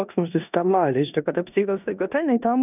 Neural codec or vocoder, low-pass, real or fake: codec, 16 kHz in and 24 kHz out, 0.9 kbps, LongCat-Audio-Codec, four codebook decoder; 3.6 kHz; fake